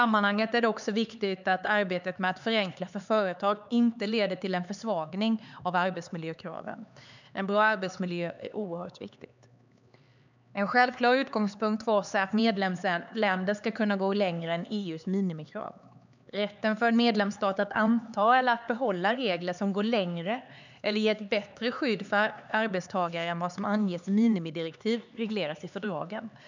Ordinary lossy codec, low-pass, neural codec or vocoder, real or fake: none; 7.2 kHz; codec, 16 kHz, 4 kbps, X-Codec, HuBERT features, trained on LibriSpeech; fake